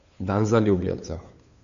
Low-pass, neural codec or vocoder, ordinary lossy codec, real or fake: 7.2 kHz; codec, 16 kHz, 2 kbps, FunCodec, trained on Chinese and English, 25 frames a second; none; fake